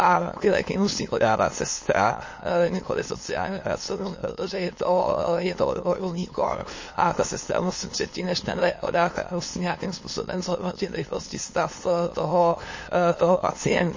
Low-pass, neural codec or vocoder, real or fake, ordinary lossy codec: 7.2 kHz; autoencoder, 22.05 kHz, a latent of 192 numbers a frame, VITS, trained on many speakers; fake; MP3, 32 kbps